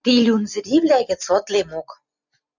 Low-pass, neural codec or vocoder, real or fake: 7.2 kHz; none; real